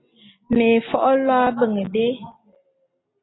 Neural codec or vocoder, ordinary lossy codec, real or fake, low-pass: none; AAC, 16 kbps; real; 7.2 kHz